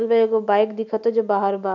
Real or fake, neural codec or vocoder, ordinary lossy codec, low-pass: real; none; none; 7.2 kHz